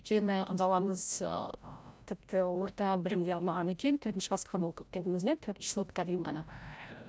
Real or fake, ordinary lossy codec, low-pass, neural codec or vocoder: fake; none; none; codec, 16 kHz, 0.5 kbps, FreqCodec, larger model